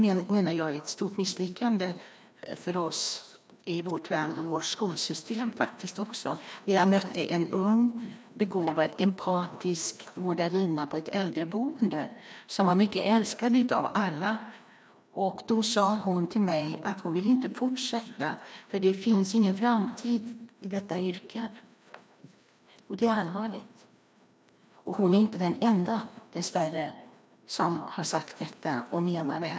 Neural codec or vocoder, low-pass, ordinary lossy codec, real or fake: codec, 16 kHz, 1 kbps, FreqCodec, larger model; none; none; fake